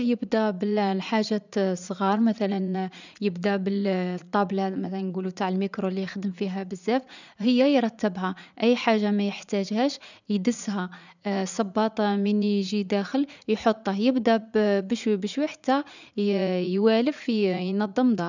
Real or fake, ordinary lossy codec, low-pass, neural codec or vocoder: fake; none; 7.2 kHz; vocoder, 44.1 kHz, 80 mel bands, Vocos